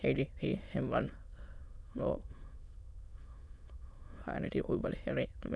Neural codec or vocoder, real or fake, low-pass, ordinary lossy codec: autoencoder, 22.05 kHz, a latent of 192 numbers a frame, VITS, trained on many speakers; fake; none; none